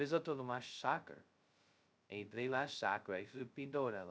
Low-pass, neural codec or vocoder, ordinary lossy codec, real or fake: none; codec, 16 kHz, 0.2 kbps, FocalCodec; none; fake